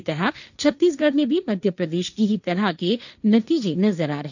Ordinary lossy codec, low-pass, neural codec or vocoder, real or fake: none; 7.2 kHz; codec, 16 kHz, 1.1 kbps, Voila-Tokenizer; fake